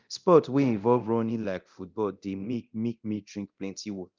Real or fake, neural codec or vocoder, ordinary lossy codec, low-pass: fake; codec, 24 kHz, 0.9 kbps, DualCodec; Opus, 24 kbps; 7.2 kHz